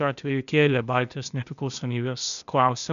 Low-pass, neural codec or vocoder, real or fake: 7.2 kHz; codec, 16 kHz, 0.8 kbps, ZipCodec; fake